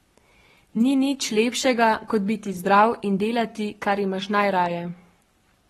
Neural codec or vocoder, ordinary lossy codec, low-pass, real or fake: codec, 44.1 kHz, 7.8 kbps, Pupu-Codec; AAC, 32 kbps; 19.8 kHz; fake